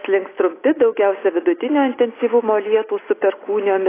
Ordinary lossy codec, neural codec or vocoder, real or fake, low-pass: AAC, 16 kbps; none; real; 3.6 kHz